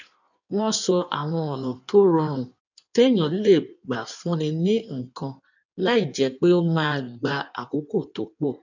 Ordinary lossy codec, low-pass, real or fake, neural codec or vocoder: none; 7.2 kHz; fake; codec, 16 kHz in and 24 kHz out, 1.1 kbps, FireRedTTS-2 codec